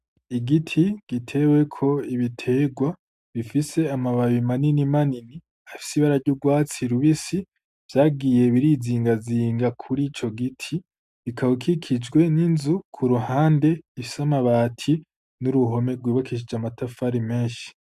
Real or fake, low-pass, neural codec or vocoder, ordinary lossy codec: real; 14.4 kHz; none; AAC, 96 kbps